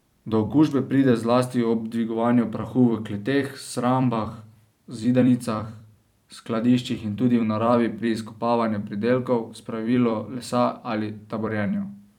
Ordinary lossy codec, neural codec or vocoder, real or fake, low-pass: none; vocoder, 44.1 kHz, 128 mel bands every 256 samples, BigVGAN v2; fake; 19.8 kHz